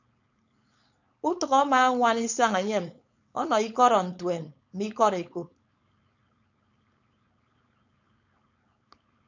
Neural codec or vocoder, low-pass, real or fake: codec, 16 kHz, 4.8 kbps, FACodec; 7.2 kHz; fake